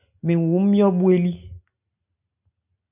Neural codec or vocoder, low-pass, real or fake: none; 3.6 kHz; real